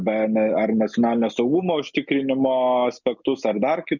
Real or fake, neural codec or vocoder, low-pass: real; none; 7.2 kHz